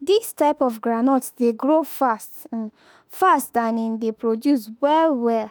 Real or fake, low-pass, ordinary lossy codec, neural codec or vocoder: fake; none; none; autoencoder, 48 kHz, 32 numbers a frame, DAC-VAE, trained on Japanese speech